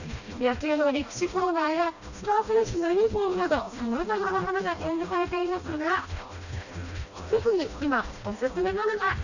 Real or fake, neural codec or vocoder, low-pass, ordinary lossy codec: fake; codec, 16 kHz, 1 kbps, FreqCodec, smaller model; 7.2 kHz; none